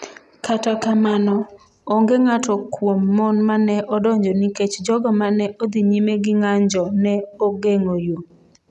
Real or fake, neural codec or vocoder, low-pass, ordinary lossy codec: real; none; none; none